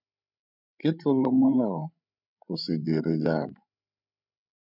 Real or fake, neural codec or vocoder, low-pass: fake; codec, 16 kHz, 8 kbps, FreqCodec, larger model; 5.4 kHz